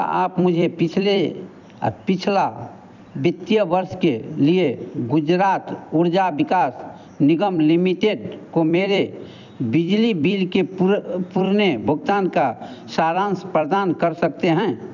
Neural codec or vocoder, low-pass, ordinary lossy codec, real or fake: vocoder, 44.1 kHz, 128 mel bands every 256 samples, BigVGAN v2; 7.2 kHz; none; fake